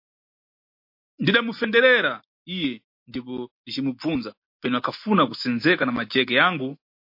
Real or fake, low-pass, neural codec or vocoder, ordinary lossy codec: real; 5.4 kHz; none; MP3, 32 kbps